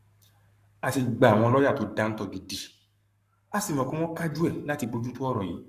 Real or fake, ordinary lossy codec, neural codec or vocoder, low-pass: fake; Opus, 64 kbps; codec, 44.1 kHz, 7.8 kbps, Pupu-Codec; 14.4 kHz